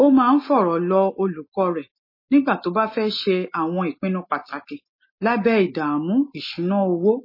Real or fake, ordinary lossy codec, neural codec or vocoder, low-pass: real; MP3, 24 kbps; none; 5.4 kHz